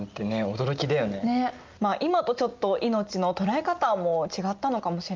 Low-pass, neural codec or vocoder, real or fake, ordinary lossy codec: 7.2 kHz; none; real; Opus, 32 kbps